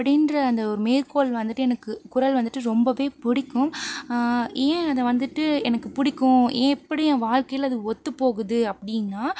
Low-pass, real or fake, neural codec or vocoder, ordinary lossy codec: none; real; none; none